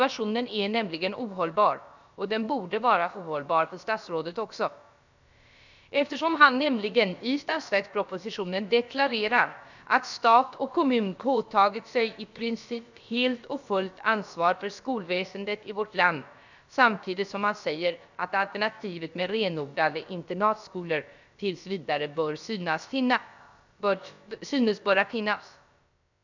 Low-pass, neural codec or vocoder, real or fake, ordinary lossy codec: 7.2 kHz; codec, 16 kHz, about 1 kbps, DyCAST, with the encoder's durations; fake; none